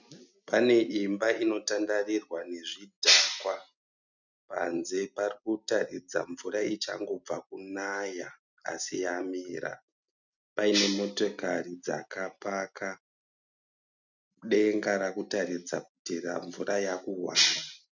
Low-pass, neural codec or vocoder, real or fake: 7.2 kHz; none; real